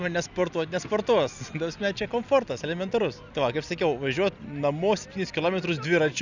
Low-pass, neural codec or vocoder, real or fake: 7.2 kHz; none; real